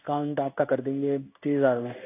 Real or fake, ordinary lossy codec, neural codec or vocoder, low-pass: fake; AAC, 24 kbps; codec, 16 kHz in and 24 kHz out, 1 kbps, XY-Tokenizer; 3.6 kHz